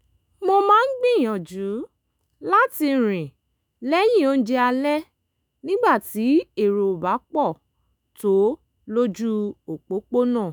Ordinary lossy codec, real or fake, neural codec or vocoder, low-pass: none; fake; autoencoder, 48 kHz, 128 numbers a frame, DAC-VAE, trained on Japanese speech; none